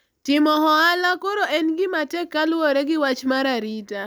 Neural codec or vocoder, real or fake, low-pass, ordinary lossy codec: none; real; none; none